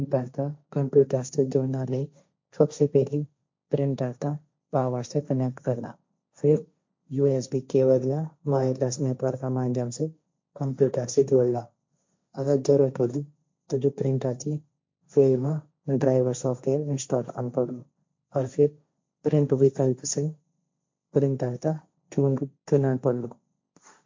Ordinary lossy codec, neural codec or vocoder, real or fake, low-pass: MP3, 48 kbps; codec, 16 kHz, 1.1 kbps, Voila-Tokenizer; fake; 7.2 kHz